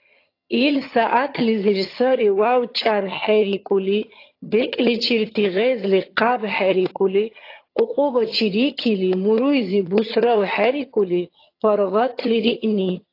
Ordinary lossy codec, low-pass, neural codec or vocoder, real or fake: AAC, 32 kbps; 5.4 kHz; vocoder, 22.05 kHz, 80 mel bands, HiFi-GAN; fake